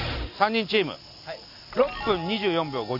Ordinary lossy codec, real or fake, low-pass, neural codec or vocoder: none; real; 5.4 kHz; none